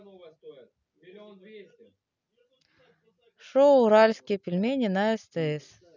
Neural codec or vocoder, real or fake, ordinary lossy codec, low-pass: none; real; none; 7.2 kHz